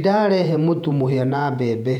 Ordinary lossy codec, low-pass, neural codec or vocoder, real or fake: none; 19.8 kHz; vocoder, 48 kHz, 128 mel bands, Vocos; fake